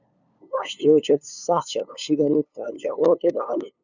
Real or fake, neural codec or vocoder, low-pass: fake; codec, 16 kHz, 2 kbps, FunCodec, trained on LibriTTS, 25 frames a second; 7.2 kHz